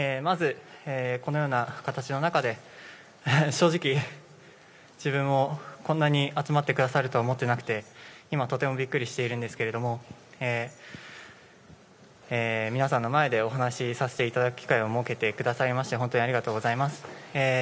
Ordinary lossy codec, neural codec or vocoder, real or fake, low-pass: none; none; real; none